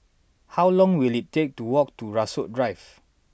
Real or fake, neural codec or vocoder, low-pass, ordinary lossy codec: real; none; none; none